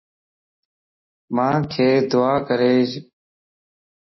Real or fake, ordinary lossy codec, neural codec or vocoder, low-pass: real; MP3, 24 kbps; none; 7.2 kHz